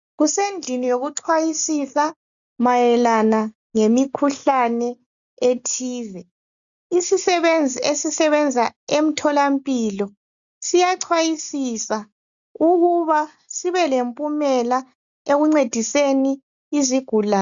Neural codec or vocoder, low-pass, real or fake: none; 7.2 kHz; real